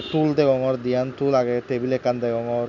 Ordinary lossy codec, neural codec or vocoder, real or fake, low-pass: none; none; real; 7.2 kHz